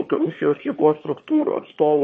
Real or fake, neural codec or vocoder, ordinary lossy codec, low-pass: fake; autoencoder, 22.05 kHz, a latent of 192 numbers a frame, VITS, trained on one speaker; MP3, 32 kbps; 9.9 kHz